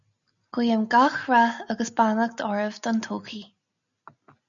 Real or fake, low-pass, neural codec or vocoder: real; 7.2 kHz; none